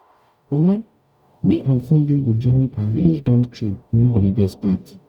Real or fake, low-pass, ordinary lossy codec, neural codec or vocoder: fake; 19.8 kHz; none; codec, 44.1 kHz, 0.9 kbps, DAC